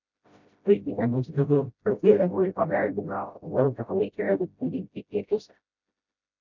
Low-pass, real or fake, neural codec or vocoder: 7.2 kHz; fake; codec, 16 kHz, 0.5 kbps, FreqCodec, smaller model